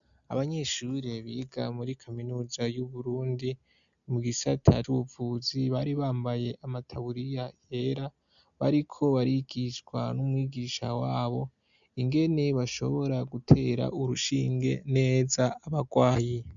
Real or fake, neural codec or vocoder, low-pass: real; none; 7.2 kHz